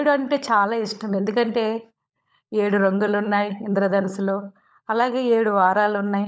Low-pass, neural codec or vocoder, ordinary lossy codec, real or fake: none; codec, 16 kHz, 16 kbps, FunCodec, trained on LibriTTS, 50 frames a second; none; fake